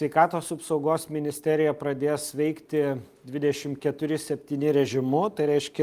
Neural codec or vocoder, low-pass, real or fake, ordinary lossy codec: none; 14.4 kHz; real; Opus, 32 kbps